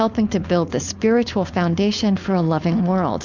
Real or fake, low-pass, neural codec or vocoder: fake; 7.2 kHz; codec, 16 kHz, 4.8 kbps, FACodec